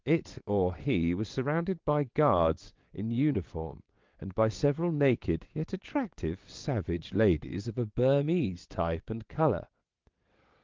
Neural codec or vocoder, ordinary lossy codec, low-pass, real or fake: vocoder, 22.05 kHz, 80 mel bands, WaveNeXt; Opus, 32 kbps; 7.2 kHz; fake